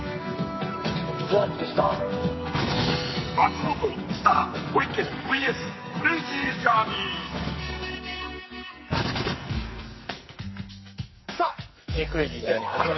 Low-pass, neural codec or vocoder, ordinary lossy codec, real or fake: 7.2 kHz; codec, 44.1 kHz, 2.6 kbps, SNAC; MP3, 24 kbps; fake